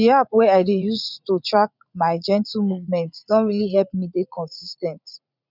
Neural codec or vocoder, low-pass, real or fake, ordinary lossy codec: vocoder, 44.1 kHz, 80 mel bands, Vocos; 5.4 kHz; fake; none